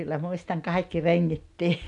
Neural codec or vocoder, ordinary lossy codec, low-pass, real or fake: none; none; 10.8 kHz; real